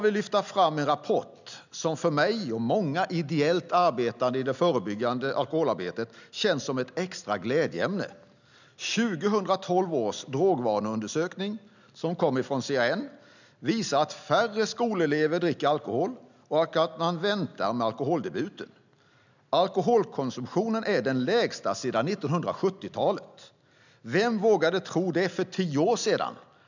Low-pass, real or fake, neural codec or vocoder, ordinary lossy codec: 7.2 kHz; real; none; none